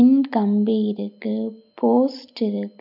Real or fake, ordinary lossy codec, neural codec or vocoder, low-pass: real; none; none; 5.4 kHz